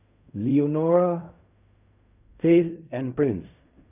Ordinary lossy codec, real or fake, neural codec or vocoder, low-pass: none; fake; codec, 16 kHz in and 24 kHz out, 0.4 kbps, LongCat-Audio-Codec, fine tuned four codebook decoder; 3.6 kHz